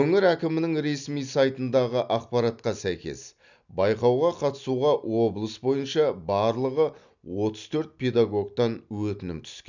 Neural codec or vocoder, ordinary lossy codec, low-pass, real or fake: none; none; 7.2 kHz; real